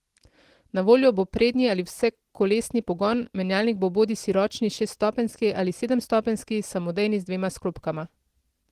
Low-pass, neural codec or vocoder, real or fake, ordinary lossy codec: 14.4 kHz; none; real; Opus, 16 kbps